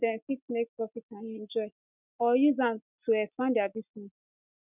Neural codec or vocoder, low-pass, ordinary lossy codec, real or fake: none; 3.6 kHz; none; real